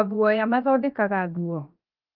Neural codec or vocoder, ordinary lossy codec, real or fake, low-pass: codec, 16 kHz, 0.7 kbps, FocalCodec; Opus, 24 kbps; fake; 5.4 kHz